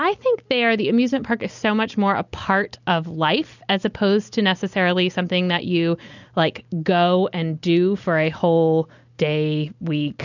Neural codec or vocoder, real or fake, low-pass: none; real; 7.2 kHz